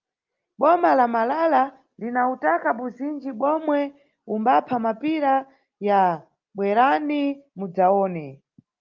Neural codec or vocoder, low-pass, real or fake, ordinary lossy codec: none; 7.2 kHz; real; Opus, 32 kbps